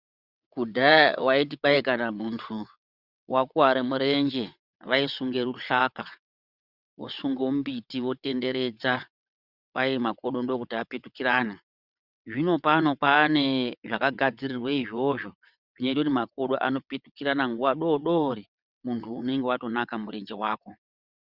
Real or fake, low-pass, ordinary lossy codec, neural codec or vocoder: fake; 5.4 kHz; Opus, 64 kbps; vocoder, 22.05 kHz, 80 mel bands, WaveNeXt